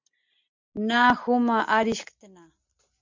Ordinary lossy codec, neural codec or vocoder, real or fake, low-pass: MP3, 64 kbps; none; real; 7.2 kHz